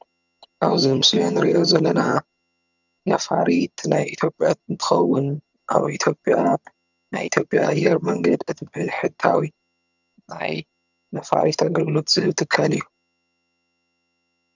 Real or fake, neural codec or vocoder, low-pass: fake; vocoder, 22.05 kHz, 80 mel bands, HiFi-GAN; 7.2 kHz